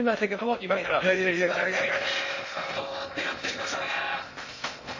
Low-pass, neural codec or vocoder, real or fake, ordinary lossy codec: 7.2 kHz; codec, 16 kHz in and 24 kHz out, 0.6 kbps, FocalCodec, streaming, 2048 codes; fake; MP3, 32 kbps